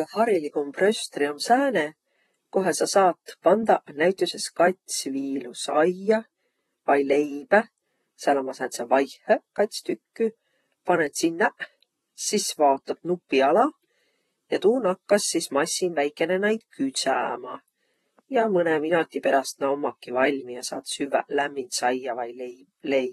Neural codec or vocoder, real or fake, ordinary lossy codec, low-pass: vocoder, 44.1 kHz, 128 mel bands, Pupu-Vocoder; fake; AAC, 32 kbps; 19.8 kHz